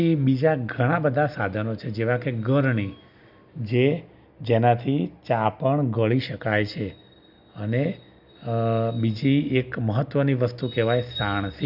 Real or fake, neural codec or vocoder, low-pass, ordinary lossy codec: real; none; 5.4 kHz; none